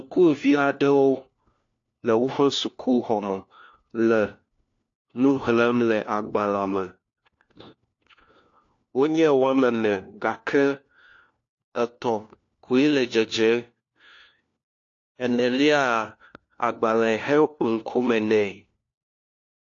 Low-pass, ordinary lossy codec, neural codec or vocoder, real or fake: 7.2 kHz; AAC, 48 kbps; codec, 16 kHz, 1 kbps, FunCodec, trained on LibriTTS, 50 frames a second; fake